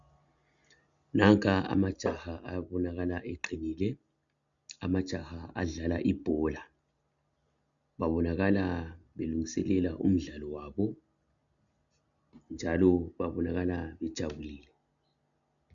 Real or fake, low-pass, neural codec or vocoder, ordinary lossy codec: real; 7.2 kHz; none; MP3, 96 kbps